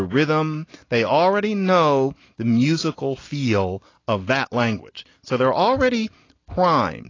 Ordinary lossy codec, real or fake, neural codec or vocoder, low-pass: AAC, 32 kbps; real; none; 7.2 kHz